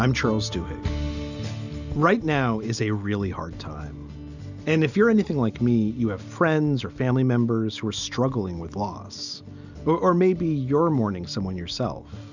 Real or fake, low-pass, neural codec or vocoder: real; 7.2 kHz; none